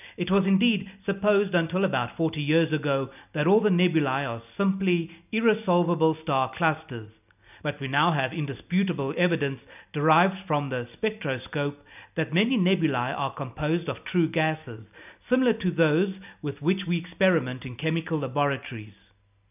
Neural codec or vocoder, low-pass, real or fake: none; 3.6 kHz; real